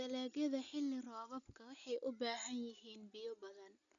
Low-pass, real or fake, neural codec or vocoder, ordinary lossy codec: 7.2 kHz; real; none; none